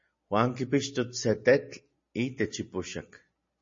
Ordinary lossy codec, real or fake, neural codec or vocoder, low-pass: MP3, 32 kbps; real; none; 7.2 kHz